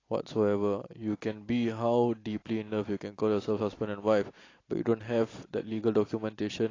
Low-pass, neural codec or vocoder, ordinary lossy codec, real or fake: 7.2 kHz; none; AAC, 32 kbps; real